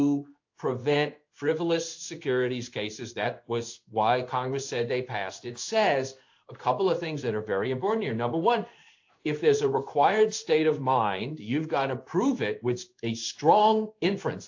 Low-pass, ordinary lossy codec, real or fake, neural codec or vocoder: 7.2 kHz; AAC, 48 kbps; fake; codec, 16 kHz in and 24 kHz out, 1 kbps, XY-Tokenizer